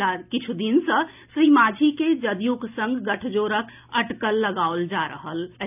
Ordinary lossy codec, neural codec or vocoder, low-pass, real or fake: none; none; 3.6 kHz; real